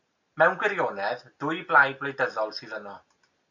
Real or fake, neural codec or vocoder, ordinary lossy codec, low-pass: real; none; MP3, 48 kbps; 7.2 kHz